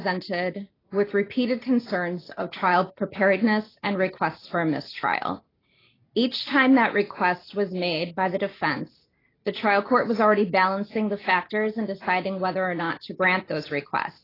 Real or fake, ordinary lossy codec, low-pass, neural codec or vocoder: real; AAC, 24 kbps; 5.4 kHz; none